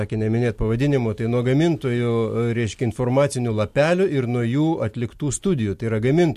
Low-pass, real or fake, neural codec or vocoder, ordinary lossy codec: 14.4 kHz; real; none; MP3, 64 kbps